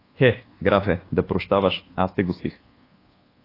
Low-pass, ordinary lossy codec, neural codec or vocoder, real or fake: 5.4 kHz; AAC, 24 kbps; codec, 24 kHz, 1.2 kbps, DualCodec; fake